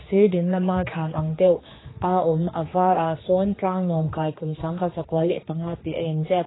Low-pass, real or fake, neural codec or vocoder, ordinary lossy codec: 7.2 kHz; fake; codec, 16 kHz, 2 kbps, X-Codec, HuBERT features, trained on general audio; AAC, 16 kbps